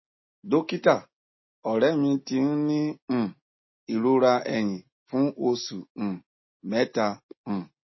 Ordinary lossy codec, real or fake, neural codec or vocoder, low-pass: MP3, 24 kbps; real; none; 7.2 kHz